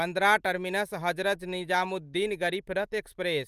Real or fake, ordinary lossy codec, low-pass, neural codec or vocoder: real; Opus, 32 kbps; 14.4 kHz; none